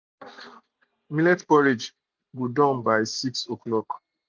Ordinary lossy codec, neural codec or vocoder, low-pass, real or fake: none; none; none; real